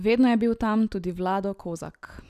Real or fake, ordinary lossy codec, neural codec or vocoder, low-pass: real; none; none; 14.4 kHz